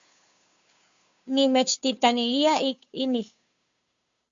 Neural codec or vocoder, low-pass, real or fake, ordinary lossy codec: codec, 16 kHz, 2 kbps, FunCodec, trained on Chinese and English, 25 frames a second; 7.2 kHz; fake; Opus, 64 kbps